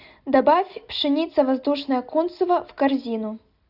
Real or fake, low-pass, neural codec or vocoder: real; 5.4 kHz; none